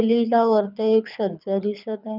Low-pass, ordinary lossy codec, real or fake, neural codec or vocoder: 5.4 kHz; none; fake; codec, 24 kHz, 6 kbps, HILCodec